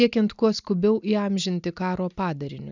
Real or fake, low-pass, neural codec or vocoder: real; 7.2 kHz; none